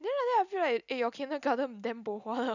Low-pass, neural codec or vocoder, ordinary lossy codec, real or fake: 7.2 kHz; none; none; real